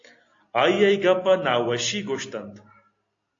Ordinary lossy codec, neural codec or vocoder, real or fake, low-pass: AAC, 48 kbps; none; real; 7.2 kHz